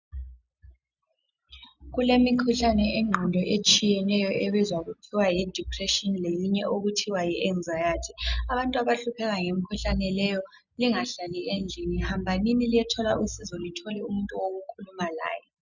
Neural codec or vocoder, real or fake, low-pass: none; real; 7.2 kHz